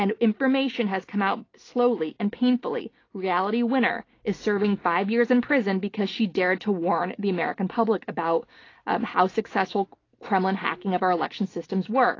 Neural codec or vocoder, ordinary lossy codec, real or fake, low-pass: none; AAC, 32 kbps; real; 7.2 kHz